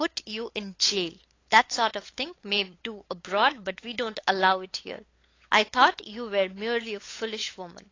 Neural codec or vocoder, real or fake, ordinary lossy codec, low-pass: codec, 16 kHz, 8 kbps, FunCodec, trained on LibriTTS, 25 frames a second; fake; AAC, 32 kbps; 7.2 kHz